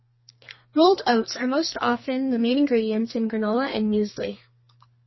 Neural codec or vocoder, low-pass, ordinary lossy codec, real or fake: codec, 32 kHz, 1.9 kbps, SNAC; 7.2 kHz; MP3, 24 kbps; fake